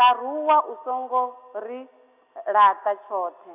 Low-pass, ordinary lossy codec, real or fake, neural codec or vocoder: 3.6 kHz; none; real; none